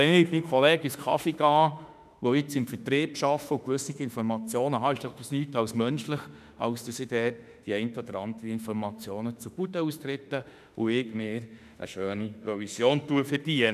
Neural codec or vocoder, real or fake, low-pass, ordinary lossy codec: autoencoder, 48 kHz, 32 numbers a frame, DAC-VAE, trained on Japanese speech; fake; 14.4 kHz; none